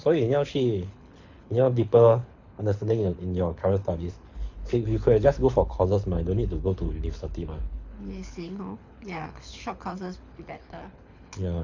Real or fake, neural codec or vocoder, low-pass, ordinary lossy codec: fake; codec, 24 kHz, 6 kbps, HILCodec; 7.2 kHz; AAC, 48 kbps